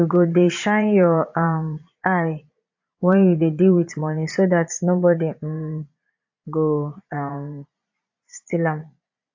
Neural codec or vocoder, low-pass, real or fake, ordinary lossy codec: vocoder, 22.05 kHz, 80 mel bands, Vocos; 7.2 kHz; fake; AAC, 48 kbps